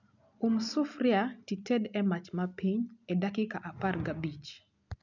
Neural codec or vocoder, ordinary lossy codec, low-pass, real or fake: none; none; 7.2 kHz; real